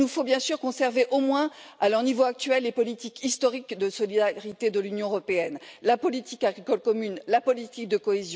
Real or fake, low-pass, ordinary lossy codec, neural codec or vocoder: real; none; none; none